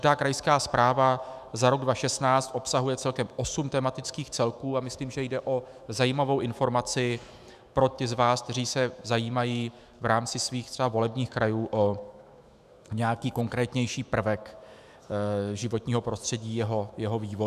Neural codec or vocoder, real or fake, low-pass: autoencoder, 48 kHz, 128 numbers a frame, DAC-VAE, trained on Japanese speech; fake; 14.4 kHz